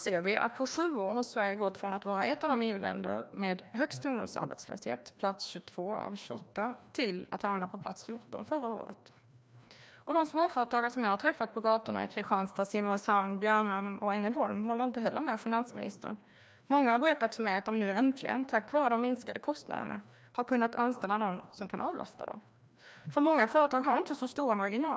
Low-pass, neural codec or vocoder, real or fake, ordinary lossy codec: none; codec, 16 kHz, 1 kbps, FreqCodec, larger model; fake; none